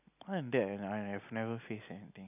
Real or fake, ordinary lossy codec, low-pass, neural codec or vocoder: real; none; 3.6 kHz; none